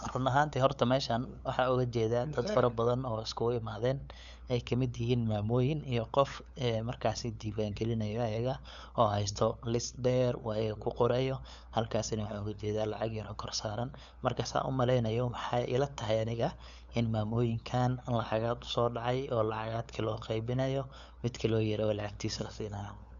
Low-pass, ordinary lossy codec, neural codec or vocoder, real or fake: 7.2 kHz; none; codec, 16 kHz, 8 kbps, FunCodec, trained on LibriTTS, 25 frames a second; fake